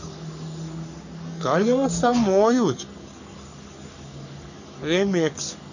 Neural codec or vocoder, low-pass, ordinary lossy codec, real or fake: codec, 44.1 kHz, 3.4 kbps, Pupu-Codec; 7.2 kHz; none; fake